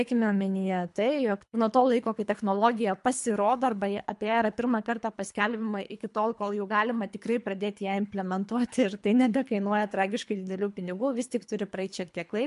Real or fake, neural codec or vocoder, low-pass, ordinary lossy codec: fake; codec, 24 kHz, 3 kbps, HILCodec; 10.8 kHz; MP3, 64 kbps